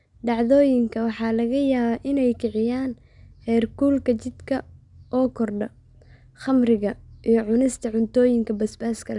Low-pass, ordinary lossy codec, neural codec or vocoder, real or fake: 10.8 kHz; none; none; real